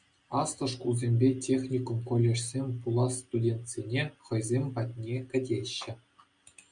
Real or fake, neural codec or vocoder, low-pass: real; none; 9.9 kHz